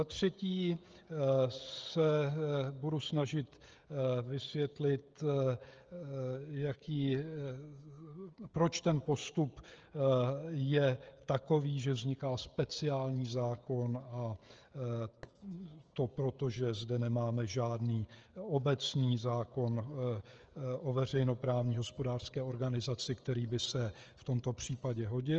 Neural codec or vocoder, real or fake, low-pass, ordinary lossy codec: codec, 16 kHz, 16 kbps, FreqCodec, smaller model; fake; 7.2 kHz; Opus, 24 kbps